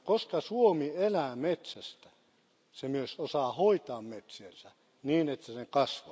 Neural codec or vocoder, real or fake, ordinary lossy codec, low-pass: none; real; none; none